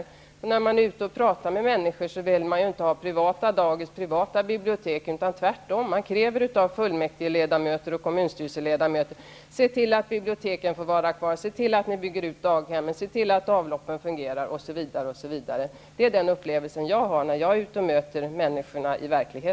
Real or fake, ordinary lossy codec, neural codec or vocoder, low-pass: real; none; none; none